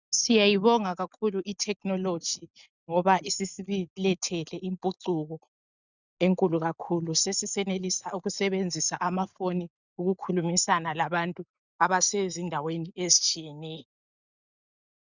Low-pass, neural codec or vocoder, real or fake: 7.2 kHz; none; real